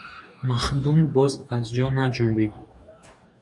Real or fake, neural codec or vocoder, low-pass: fake; codec, 44.1 kHz, 2.6 kbps, DAC; 10.8 kHz